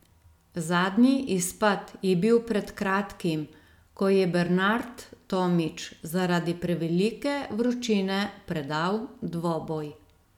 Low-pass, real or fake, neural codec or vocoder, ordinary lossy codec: 19.8 kHz; real; none; none